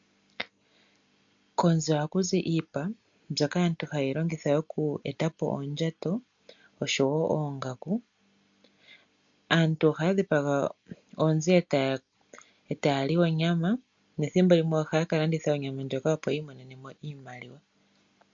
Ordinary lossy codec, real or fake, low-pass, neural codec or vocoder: MP3, 64 kbps; real; 7.2 kHz; none